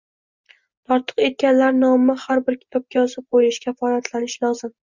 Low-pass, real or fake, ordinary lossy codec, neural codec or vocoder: 7.2 kHz; real; Opus, 64 kbps; none